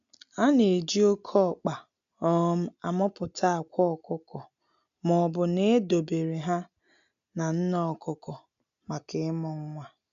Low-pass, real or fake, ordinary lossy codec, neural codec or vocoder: 7.2 kHz; real; none; none